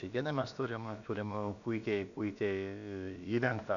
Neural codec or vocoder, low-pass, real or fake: codec, 16 kHz, about 1 kbps, DyCAST, with the encoder's durations; 7.2 kHz; fake